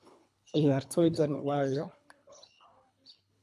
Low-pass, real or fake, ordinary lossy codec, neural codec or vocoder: none; fake; none; codec, 24 kHz, 3 kbps, HILCodec